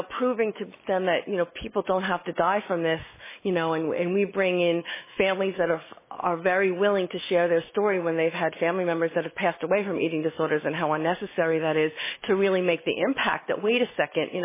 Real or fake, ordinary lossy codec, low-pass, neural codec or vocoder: fake; MP3, 16 kbps; 3.6 kHz; autoencoder, 48 kHz, 128 numbers a frame, DAC-VAE, trained on Japanese speech